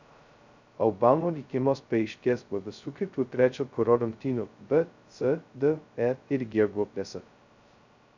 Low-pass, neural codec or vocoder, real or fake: 7.2 kHz; codec, 16 kHz, 0.2 kbps, FocalCodec; fake